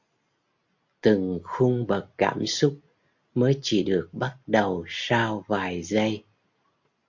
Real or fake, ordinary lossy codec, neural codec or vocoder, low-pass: real; MP3, 48 kbps; none; 7.2 kHz